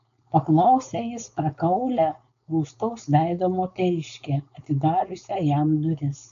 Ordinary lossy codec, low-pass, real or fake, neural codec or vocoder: AAC, 96 kbps; 7.2 kHz; fake; codec, 16 kHz, 4.8 kbps, FACodec